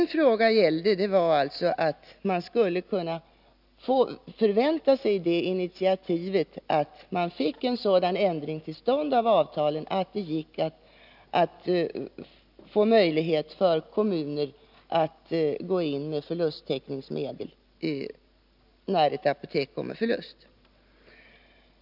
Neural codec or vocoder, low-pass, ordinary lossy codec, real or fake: none; 5.4 kHz; none; real